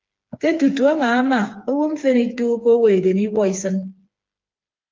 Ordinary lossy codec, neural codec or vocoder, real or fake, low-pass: Opus, 32 kbps; codec, 16 kHz, 4 kbps, FreqCodec, smaller model; fake; 7.2 kHz